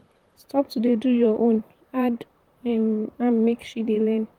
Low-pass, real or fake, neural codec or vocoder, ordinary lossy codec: 19.8 kHz; fake; vocoder, 44.1 kHz, 128 mel bands, Pupu-Vocoder; Opus, 32 kbps